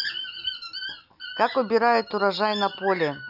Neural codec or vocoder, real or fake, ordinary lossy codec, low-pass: none; real; none; 5.4 kHz